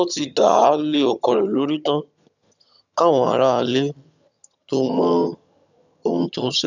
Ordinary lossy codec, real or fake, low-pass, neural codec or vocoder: none; fake; 7.2 kHz; vocoder, 22.05 kHz, 80 mel bands, HiFi-GAN